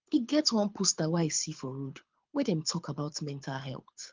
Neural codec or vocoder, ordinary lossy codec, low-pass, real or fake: codec, 24 kHz, 6 kbps, HILCodec; Opus, 32 kbps; 7.2 kHz; fake